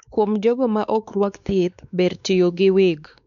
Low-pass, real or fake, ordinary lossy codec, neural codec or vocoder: 7.2 kHz; fake; none; codec, 16 kHz, 2 kbps, X-Codec, WavLM features, trained on Multilingual LibriSpeech